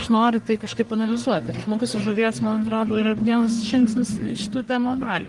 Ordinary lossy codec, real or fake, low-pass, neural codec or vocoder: Opus, 24 kbps; fake; 10.8 kHz; codec, 44.1 kHz, 1.7 kbps, Pupu-Codec